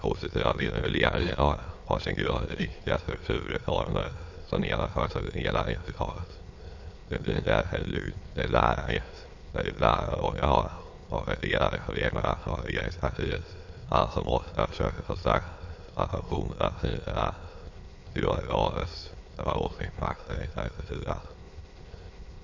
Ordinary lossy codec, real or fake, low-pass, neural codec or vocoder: MP3, 48 kbps; fake; 7.2 kHz; autoencoder, 22.05 kHz, a latent of 192 numbers a frame, VITS, trained on many speakers